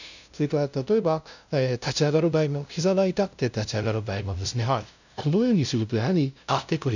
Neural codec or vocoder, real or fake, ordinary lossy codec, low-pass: codec, 16 kHz, 0.5 kbps, FunCodec, trained on LibriTTS, 25 frames a second; fake; none; 7.2 kHz